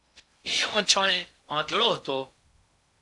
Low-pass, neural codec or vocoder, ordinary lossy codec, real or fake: 10.8 kHz; codec, 16 kHz in and 24 kHz out, 0.6 kbps, FocalCodec, streaming, 2048 codes; AAC, 64 kbps; fake